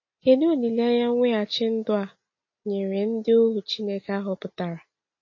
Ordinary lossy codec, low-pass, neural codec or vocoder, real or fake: MP3, 32 kbps; 7.2 kHz; none; real